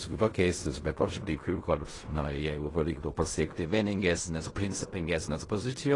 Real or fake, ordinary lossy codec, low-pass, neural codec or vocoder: fake; AAC, 32 kbps; 10.8 kHz; codec, 16 kHz in and 24 kHz out, 0.4 kbps, LongCat-Audio-Codec, fine tuned four codebook decoder